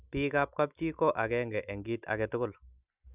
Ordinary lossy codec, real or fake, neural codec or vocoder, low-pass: none; real; none; 3.6 kHz